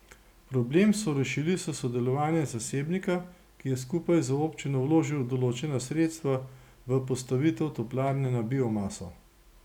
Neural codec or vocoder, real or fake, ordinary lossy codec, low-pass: none; real; none; 19.8 kHz